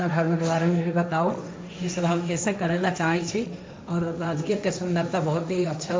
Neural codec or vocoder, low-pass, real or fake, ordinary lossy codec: codec, 16 kHz, 1.1 kbps, Voila-Tokenizer; none; fake; none